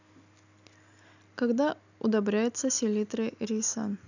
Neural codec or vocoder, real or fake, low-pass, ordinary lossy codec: none; real; 7.2 kHz; none